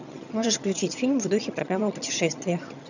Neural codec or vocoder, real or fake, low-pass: vocoder, 22.05 kHz, 80 mel bands, HiFi-GAN; fake; 7.2 kHz